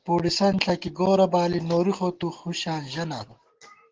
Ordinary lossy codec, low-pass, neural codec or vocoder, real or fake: Opus, 16 kbps; 7.2 kHz; none; real